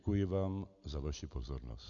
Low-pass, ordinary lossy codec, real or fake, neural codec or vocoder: 7.2 kHz; MP3, 64 kbps; real; none